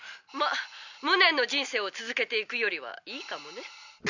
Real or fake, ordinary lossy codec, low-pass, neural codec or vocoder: real; none; 7.2 kHz; none